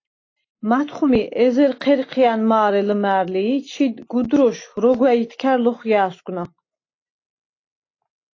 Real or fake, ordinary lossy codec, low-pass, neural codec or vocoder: real; AAC, 32 kbps; 7.2 kHz; none